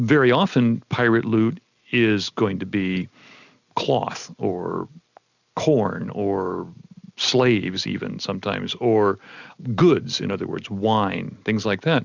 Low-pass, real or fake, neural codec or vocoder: 7.2 kHz; real; none